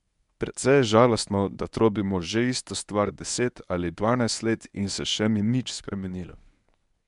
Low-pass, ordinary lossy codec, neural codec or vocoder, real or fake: 10.8 kHz; none; codec, 24 kHz, 0.9 kbps, WavTokenizer, medium speech release version 1; fake